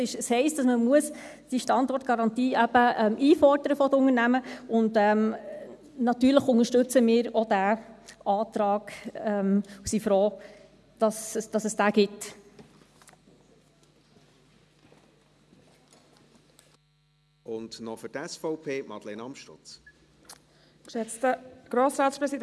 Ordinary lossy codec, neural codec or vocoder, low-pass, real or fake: none; none; none; real